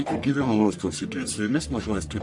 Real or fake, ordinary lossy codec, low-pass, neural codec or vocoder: fake; AAC, 64 kbps; 10.8 kHz; codec, 44.1 kHz, 1.7 kbps, Pupu-Codec